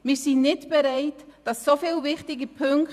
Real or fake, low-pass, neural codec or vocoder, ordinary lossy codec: fake; 14.4 kHz; vocoder, 48 kHz, 128 mel bands, Vocos; none